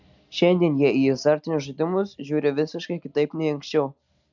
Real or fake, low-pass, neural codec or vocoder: real; 7.2 kHz; none